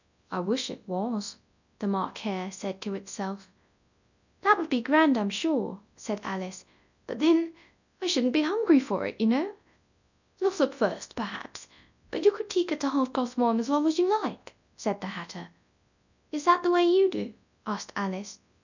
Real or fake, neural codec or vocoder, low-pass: fake; codec, 24 kHz, 0.9 kbps, WavTokenizer, large speech release; 7.2 kHz